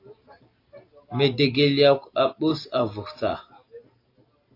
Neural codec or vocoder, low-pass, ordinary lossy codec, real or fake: none; 5.4 kHz; AAC, 32 kbps; real